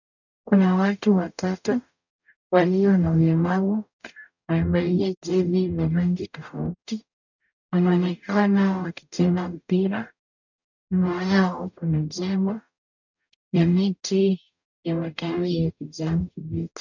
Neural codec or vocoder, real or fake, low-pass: codec, 44.1 kHz, 0.9 kbps, DAC; fake; 7.2 kHz